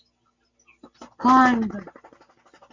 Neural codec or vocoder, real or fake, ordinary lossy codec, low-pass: none; real; Opus, 64 kbps; 7.2 kHz